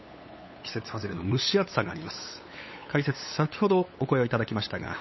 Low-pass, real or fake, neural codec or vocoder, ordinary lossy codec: 7.2 kHz; fake; codec, 16 kHz, 8 kbps, FunCodec, trained on LibriTTS, 25 frames a second; MP3, 24 kbps